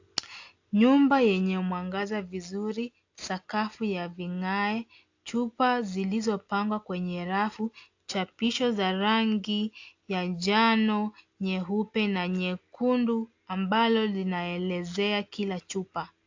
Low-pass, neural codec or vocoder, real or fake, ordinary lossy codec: 7.2 kHz; none; real; AAC, 48 kbps